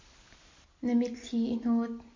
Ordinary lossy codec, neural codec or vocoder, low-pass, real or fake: MP3, 48 kbps; none; 7.2 kHz; real